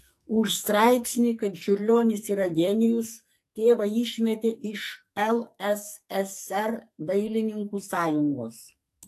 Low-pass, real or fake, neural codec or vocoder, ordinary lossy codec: 14.4 kHz; fake; codec, 44.1 kHz, 2.6 kbps, SNAC; AAC, 64 kbps